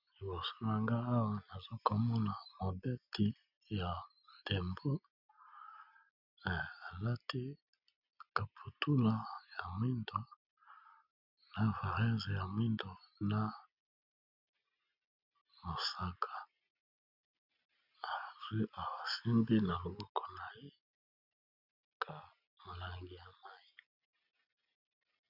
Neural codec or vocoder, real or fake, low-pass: none; real; 5.4 kHz